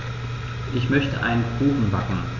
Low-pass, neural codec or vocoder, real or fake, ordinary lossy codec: 7.2 kHz; none; real; none